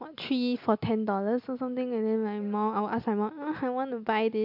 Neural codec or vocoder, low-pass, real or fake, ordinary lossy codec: none; 5.4 kHz; real; MP3, 48 kbps